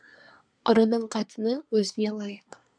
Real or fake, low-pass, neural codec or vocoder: fake; 9.9 kHz; codec, 24 kHz, 1 kbps, SNAC